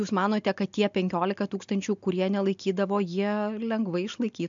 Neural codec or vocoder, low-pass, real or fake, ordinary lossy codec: none; 7.2 kHz; real; MP3, 64 kbps